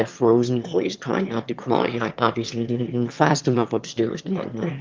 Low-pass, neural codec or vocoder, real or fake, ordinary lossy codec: 7.2 kHz; autoencoder, 22.05 kHz, a latent of 192 numbers a frame, VITS, trained on one speaker; fake; Opus, 32 kbps